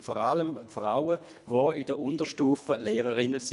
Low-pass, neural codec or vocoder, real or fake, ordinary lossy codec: 10.8 kHz; codec, 24 kHz, 1.5 kbps, HILCodec; fake; none